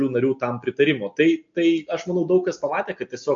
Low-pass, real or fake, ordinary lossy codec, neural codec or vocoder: 7.2 kHz; real; MP3, 48 kbps; none